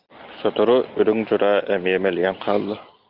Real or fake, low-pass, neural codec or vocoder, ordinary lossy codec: real; 5.4 kHz; none; Opus, 24 kbps